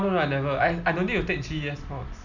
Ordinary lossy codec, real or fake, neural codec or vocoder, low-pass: none; real; none; 7.2 kHz